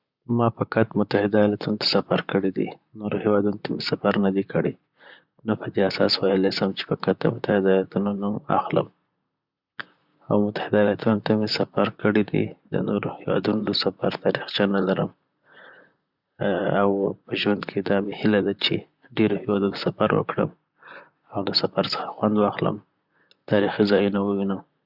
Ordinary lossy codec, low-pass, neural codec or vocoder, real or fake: Opus, 64 kbps; 5.4 kHz; vocoder, 44.1 kHz, 128 mel bands, Pupu-Vocoder; fake